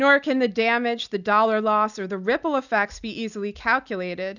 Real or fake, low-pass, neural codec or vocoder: real; 7.2 kHz; none